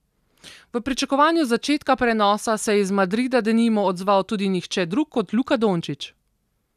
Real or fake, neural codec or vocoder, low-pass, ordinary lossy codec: real; none; 14.4 kHz; none